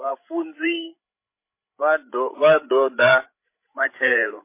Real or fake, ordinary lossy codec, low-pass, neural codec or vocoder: fake; MP3, 24 kbps; 3.6 kHz; codec, 16 kHz, 16 kbps, FreqCodec, larger model